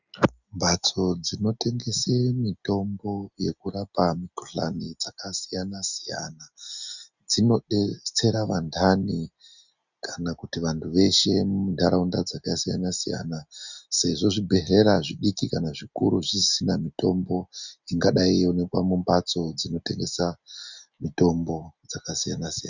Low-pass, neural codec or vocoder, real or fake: 7.2 kHz; none; real